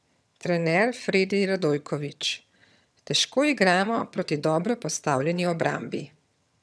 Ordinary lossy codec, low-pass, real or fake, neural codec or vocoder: none; none; fake; vocoder, 22.05 kHz, 80 mel bands, HiFi-GAN